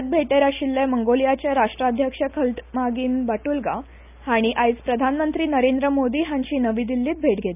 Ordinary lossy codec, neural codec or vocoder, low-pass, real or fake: none; none; 3.6 kHz; real